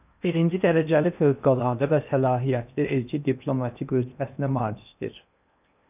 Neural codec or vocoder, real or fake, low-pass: codec, 16 kHz in and 24 kHz out, 0.8 kbps, FocalCodec, streaming, 65536 codes; fake; 3.6 kHz